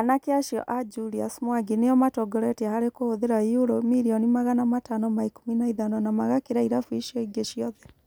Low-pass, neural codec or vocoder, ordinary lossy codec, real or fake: none; none; none; real